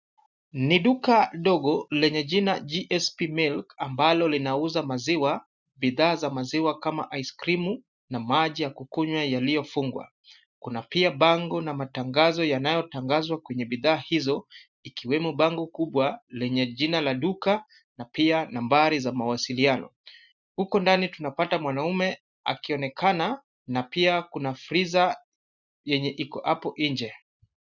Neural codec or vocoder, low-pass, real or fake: none; 7.2 kHz; real